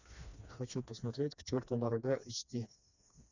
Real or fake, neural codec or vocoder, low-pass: fake; codec, 16 kHz, 2 kbps, FreqCodec, smaller model; 7.2 kHz